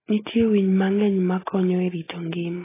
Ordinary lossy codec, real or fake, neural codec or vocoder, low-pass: AAC, 16 kbps; real; none; 3.6 kHz